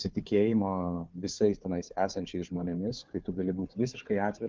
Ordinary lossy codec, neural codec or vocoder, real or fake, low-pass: Opus, 24 kbps; codec, 16 kHz, 4 kbps, FunCodec, trained on Chinese and English, 50 frames a second; fake; 7.2 kHz